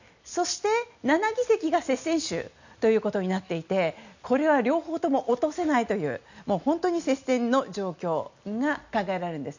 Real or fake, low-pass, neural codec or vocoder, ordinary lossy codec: real; 7.2 kHz; none; none